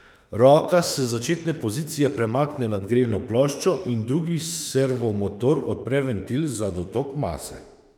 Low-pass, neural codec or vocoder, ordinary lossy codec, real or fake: 19.8 kHz; autoencoder, 48 kHz, 32 numbers a frame, DAC-VAE, trained on Japanese speech; none; fake